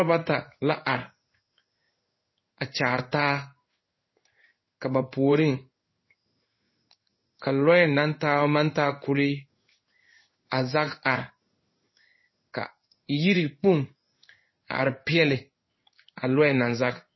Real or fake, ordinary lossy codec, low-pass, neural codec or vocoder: fake; MP3, 24 kbps; 7.2 kHz; codec, 16 kHz in and 24 kHz out, 1 kbps, XY-Tokenizer